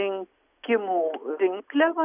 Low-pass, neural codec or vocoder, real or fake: 3.6 kHz; none; real